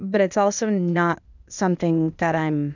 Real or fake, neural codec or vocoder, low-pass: fake; codec, 16 kHz, 0.8 kbps, ZipCodec; 7.2 kHz